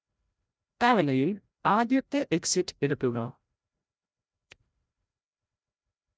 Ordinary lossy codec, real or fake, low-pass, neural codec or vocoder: none; fake; none; codec, 16 kHz, 0.5 kbps, FreqCodec, larger model